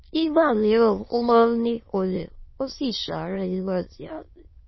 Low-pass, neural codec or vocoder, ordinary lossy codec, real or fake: 7.2 kHz; autoencoder, 22.05 kHz, a latent of 192 numbers a frame, VITS, trained on many speakers; MP3, 24 kbps; fake